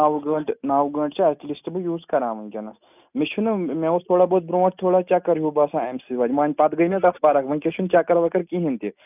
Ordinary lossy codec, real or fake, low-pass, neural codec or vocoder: none; real; 3.6 kHz; none